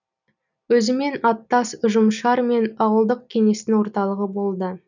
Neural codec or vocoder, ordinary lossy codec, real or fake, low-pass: none; none; real; 7.2 kHz